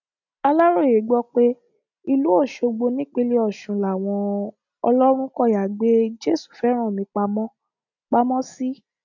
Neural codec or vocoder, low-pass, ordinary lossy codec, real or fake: none; 7.2 kHz; none; real